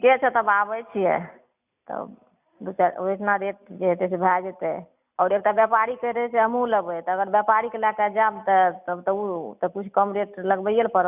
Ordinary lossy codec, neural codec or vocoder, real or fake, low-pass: none; none; real; 3.6 kHz